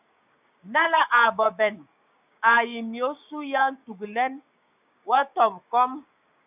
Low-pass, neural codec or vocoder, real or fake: 3.6 kHz; codec, 16 kHz, 6 kbps, DAC; fake